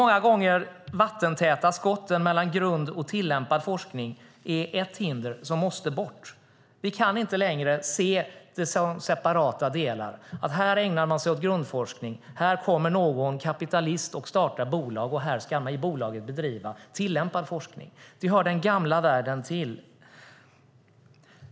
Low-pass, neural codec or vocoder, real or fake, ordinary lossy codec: none; none; real; none